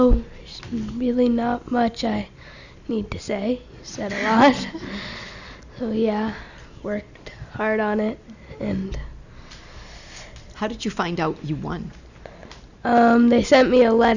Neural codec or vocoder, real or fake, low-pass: none; real; 7.2 kHz